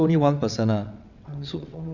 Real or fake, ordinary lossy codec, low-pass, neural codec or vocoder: fake; none; 7.2 kHz; vocoder, 22.05 kHz, 80 mel bands, WaveNeXt